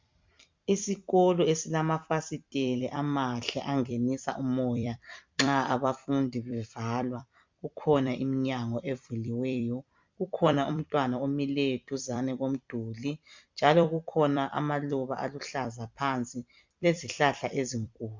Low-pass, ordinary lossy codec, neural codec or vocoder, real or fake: 7.2 kHz; MP3, 64 kbps; vocoder, 44.1 kHz, 128 mel bands every 256 samples, BigVGAN v2; fake